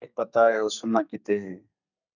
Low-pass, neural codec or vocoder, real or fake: 7.2 kHz; codec, 32 kHz, 1.9 kbps, SNAC; fake